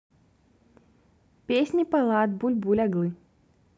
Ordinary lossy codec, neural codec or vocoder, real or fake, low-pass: none; none; real; none